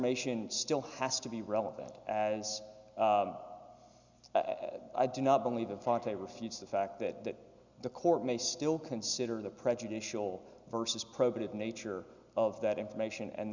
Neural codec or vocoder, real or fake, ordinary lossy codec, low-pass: none; real; Opus, 64 kbps; 7.2 kHz